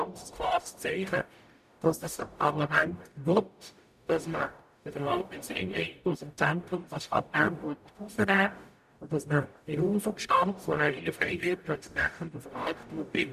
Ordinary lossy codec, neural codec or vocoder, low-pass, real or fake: none; codec, 44.1 kHz, 0.9 kbps, DAC; 14.4 kHz; fake